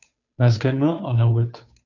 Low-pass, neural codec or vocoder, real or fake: 7.2 kHz; codec, 16 kHz, 2 kbps, FunCodec, trained on Chinese and English, 25 frames a second; fake